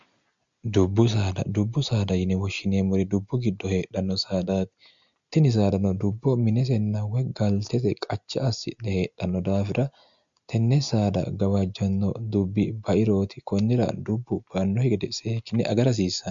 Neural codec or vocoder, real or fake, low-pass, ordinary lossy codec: none; real; 7.2 kHz; MP3, 64 kbps